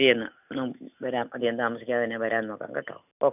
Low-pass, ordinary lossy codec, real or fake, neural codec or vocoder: 3.6 kHz; none; real; none